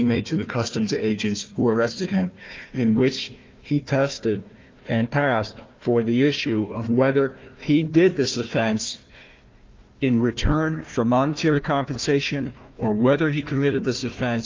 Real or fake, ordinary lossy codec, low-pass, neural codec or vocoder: fake; Opus, 24 kbps; 7.2 kHz; codec, 16 kHz, 1 kbps, FunCodec, trained on Chinese and English, 50 frames a second